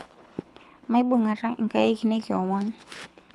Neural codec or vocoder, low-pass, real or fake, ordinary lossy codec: codec, 24 kHz, 6 kbps, HILCodec; none; fake; none